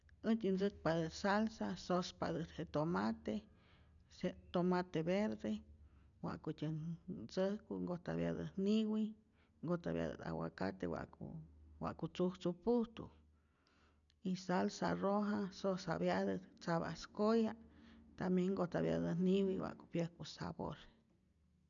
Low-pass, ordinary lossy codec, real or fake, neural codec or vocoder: 7.2 kHz; none; real; none